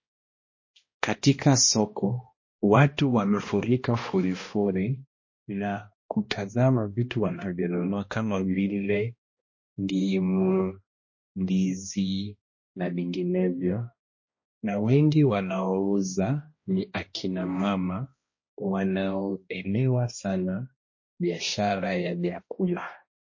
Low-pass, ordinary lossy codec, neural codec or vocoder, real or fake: 7.2 kHz; MP3, 32 kbps; codec, 16 kHz, 1 kbps, X-Codec, HuBERT features, trained on balanced general audio; fake